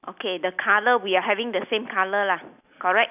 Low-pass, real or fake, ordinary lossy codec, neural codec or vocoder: 3.6 kHz; real; none; none